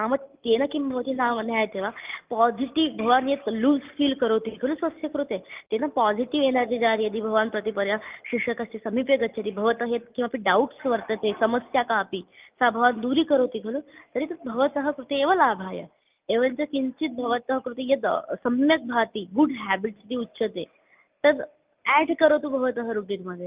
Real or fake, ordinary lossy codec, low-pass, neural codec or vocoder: real; Opus, 24 kbps; 3.6 kHz; none